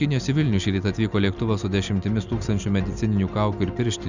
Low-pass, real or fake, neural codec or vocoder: 7.2 kHz; real; none